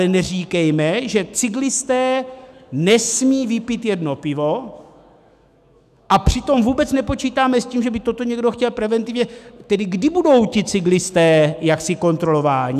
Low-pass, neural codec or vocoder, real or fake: 14.4 kHz; autoencoder, 48 kHz, 128 numbers a frame, DAC-VAE, trained on Japanese speech; fake